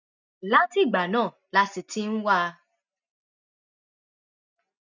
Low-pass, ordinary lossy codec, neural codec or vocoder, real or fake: 7.2 kHz; none; none; real